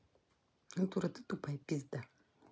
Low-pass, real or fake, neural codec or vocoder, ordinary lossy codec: none; real; none; none